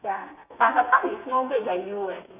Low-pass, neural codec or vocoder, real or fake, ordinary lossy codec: 3.6 kHz; codec, 32 kHz, 1.9 kbps, SNAC; fake; none